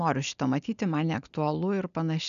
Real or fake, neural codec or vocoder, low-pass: real; none; 7.2 kHz